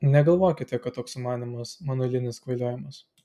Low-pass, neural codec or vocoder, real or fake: 14.4 kHz; none; real